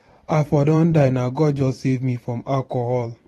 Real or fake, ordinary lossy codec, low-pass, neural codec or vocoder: fake; AAC, 32 kbps; 19.8 kHz; vocoder, 44.1 kHz, 128 mel bands every 512 samples, BigVGAN v2